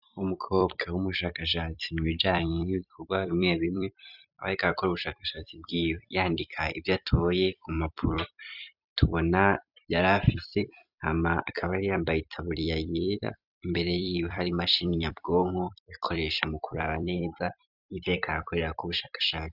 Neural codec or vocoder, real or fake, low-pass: vocoder, 44.1 kHz, 128 mel bands every 256 samples, BigVGAN v2; fake; 5.4 kHz